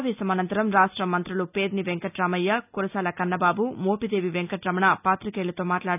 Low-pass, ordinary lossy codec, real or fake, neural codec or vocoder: 3.6 kHz; none; real; none